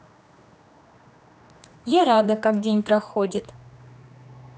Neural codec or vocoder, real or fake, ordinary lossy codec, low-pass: codec, 16 kHz, 2 kbps, X-Codec, HuBERT features, trained on general audio; fake; none; none